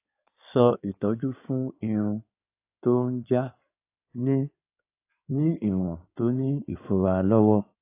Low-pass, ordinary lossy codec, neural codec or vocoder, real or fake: 3.6 kHz; AAC, 24 kbps; codec, 16 kHz in and 24 kHz out, 2.2 kbps, FireRedTTS-2 codec; fake